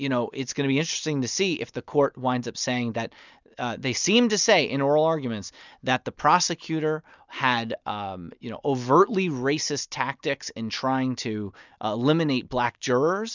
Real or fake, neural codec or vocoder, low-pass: real; none; 7.2 kHz